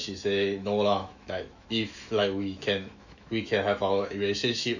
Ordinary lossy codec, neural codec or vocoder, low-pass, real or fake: none; autoencoder, 48 kHz, 128 numbers a frame, DAC-VAE, trained on Japanese speech; 7.2 kHz; fake